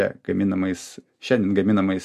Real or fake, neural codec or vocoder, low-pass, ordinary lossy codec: real; none; 14.4 kHz; MP3, 64 kbps